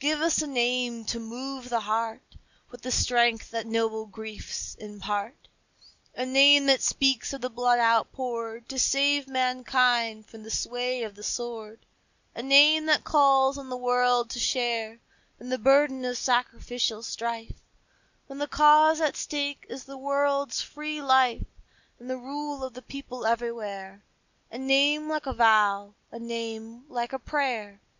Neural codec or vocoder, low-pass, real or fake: none; 7.2 kHz; real